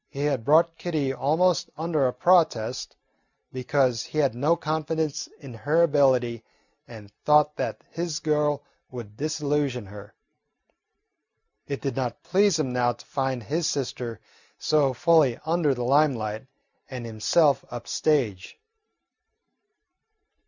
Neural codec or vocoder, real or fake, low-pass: none; real; 7.2 kHz